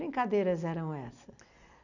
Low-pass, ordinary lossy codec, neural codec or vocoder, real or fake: 7.2 kHz; none; none; real